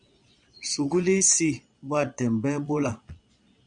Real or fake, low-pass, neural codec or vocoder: fake; 9.9 kHz; vocoder, 22.05 kHz, 80 mel bands, Vocos